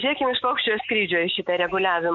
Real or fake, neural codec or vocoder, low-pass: real; none; 7.2 kHz